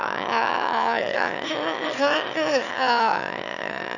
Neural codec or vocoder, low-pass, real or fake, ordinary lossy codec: autoencoder, 22.05 kHz, a latent of 192 numbers a frame, VITS, trained on one speaker; 7.2 kHz; fake; none